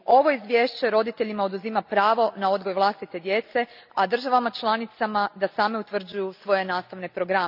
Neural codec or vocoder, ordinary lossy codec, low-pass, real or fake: none; none; 5.4 kHz; real